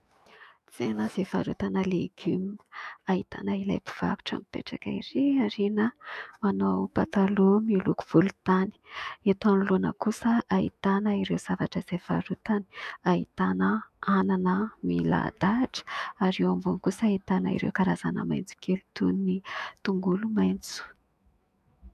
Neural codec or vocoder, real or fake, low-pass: autoencoder, 48 kHz, 128 numbers a frame, DAC-VAE, trained on Japanese speech; fake; 14.4 kHz